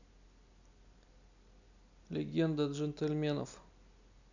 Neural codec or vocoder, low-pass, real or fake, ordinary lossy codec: none; 7.2 kHz; real; none